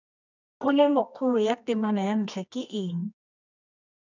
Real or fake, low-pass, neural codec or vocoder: fake; 7.2 kHz; codec, 24 kHz, 0.9 kbps, WavTokenizer, medium music audio release